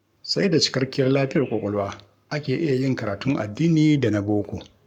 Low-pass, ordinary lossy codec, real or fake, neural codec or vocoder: 19.8 kHz; none; fake; codec, 44.1 kHz, 7.8 kbps, Pupu-Codec